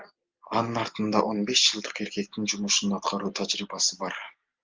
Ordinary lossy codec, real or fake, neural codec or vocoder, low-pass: Opus, 24 kbps; real; none; 7.2 kHz